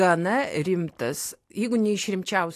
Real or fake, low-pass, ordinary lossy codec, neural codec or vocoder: real; 14.4 kHz; AAC, 64 kbps; none